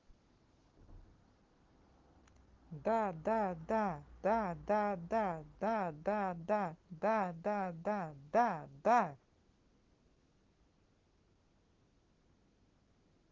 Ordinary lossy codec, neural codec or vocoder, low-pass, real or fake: Opus, 16 kbps; none; 7.2 kHz; real